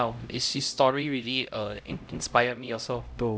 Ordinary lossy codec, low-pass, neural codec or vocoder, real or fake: none; none; codec, 16 kHz, 0.5 kbps, X-Codec, HuBERT features, trained on LibriSpeech; fake